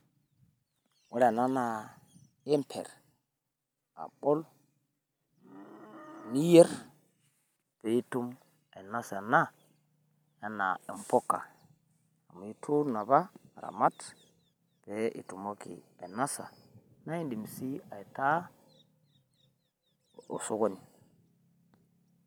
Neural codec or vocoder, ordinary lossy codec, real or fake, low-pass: none; none; real; none